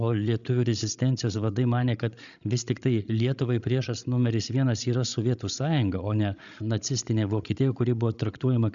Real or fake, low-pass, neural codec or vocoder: fake; 7.2 kHz; codec, 16 kHz, 16 kbps, FreqCodec, larger model